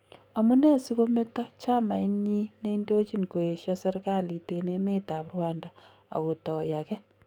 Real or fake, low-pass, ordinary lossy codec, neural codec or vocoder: fake; 19.8 kHz; none; codec, 44.1 kHz, 7.8 kbps, DAC